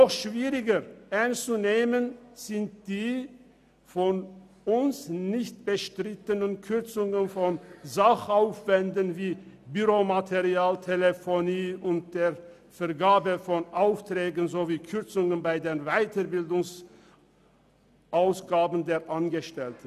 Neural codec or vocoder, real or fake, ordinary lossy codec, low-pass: none; real; none; 14.4 kHz